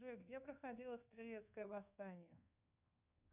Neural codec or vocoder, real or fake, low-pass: codec, 24 kHz, 1.2 kbps, DualCodec; fake; 3.6 kHz